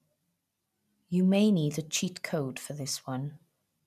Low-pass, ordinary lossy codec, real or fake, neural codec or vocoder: 14.4 kHz; none; real; none